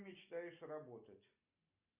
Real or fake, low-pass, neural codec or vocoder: real; 3.6 kHz; none